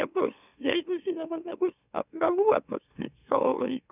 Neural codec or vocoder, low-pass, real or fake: autoencoder, 44.1 kHz, a latent of 192 numbers a frame, MeloTTS; 3.6 kHz; fake